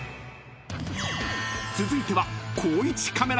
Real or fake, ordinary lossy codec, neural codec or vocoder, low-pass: real; none; none; none